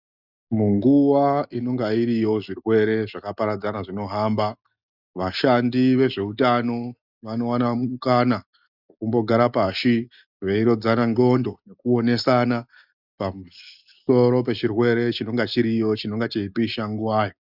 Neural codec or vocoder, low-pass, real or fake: none; 5.4 kHz; real